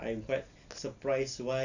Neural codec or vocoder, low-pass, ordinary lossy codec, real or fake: none; 7.2 kHz; none; real